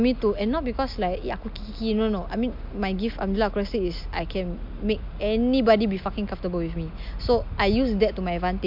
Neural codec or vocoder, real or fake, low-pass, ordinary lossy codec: none; real; 5.4 kHz; MP3, 48 kbps